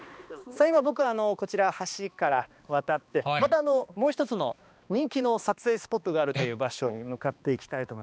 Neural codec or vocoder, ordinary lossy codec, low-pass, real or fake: codec, 16 kHz, 2 kbps, X-Codec, HuBERT features, trained on balanced general audio; none; none; fake